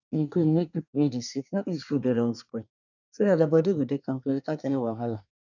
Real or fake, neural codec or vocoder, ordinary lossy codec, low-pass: fake; codec, 24 kHz, 1 kbps, SNAC; none; 7.2 kHz